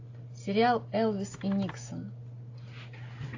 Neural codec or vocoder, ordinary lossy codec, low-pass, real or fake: none; AAC, 48 kbps; 7.2 kHz; real